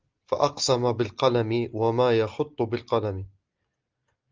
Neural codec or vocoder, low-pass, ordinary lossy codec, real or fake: none; 7.2 kHz; Opus, 24 kbps; real